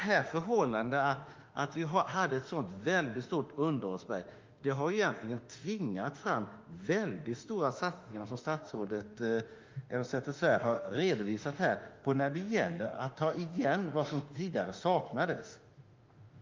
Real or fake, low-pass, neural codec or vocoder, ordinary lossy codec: fake; 7.2 kHz; autoencoder, 48 kHz, 32 numbers a frame, DAC-VAE, trained on Japanese speech; Opus, 32 kbps